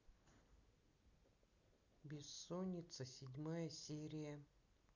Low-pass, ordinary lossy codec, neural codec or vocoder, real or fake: 7.2 kHz; none; none; real